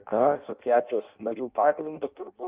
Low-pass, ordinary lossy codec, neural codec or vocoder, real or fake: 3.6 kHz; Opus, 32 kbps; codec, 16 kHz in and 24 kHz out, 0.6 kbps, FireRedTTS-2 codec; fake